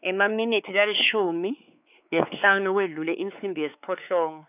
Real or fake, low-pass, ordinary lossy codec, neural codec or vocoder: fake; 3.6 kHz; none; codec, 16 kHz, 4 kbps, X-Codec, WavLM features, trained on Multilingual LibriSpeech